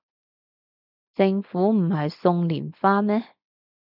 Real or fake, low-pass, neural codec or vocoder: real; 5.4 kHz; none